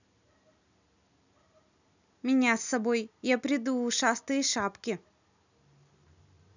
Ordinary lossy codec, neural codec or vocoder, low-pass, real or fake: none; none; 7.2 kHz; real